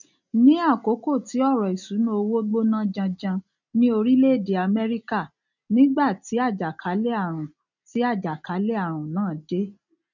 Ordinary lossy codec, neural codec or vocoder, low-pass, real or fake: none; none; 7.2 kHz; real